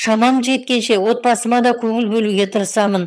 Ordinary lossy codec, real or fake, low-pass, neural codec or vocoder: none; fake; none; vocoder, 22.05 kHz, 80 mel bands, HiFi-GAN